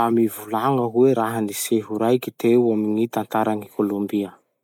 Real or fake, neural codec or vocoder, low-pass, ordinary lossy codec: real; none; 19.8 kHz; none